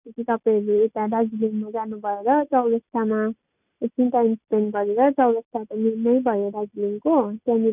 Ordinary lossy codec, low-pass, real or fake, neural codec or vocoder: none; 3.6 kHz; real; none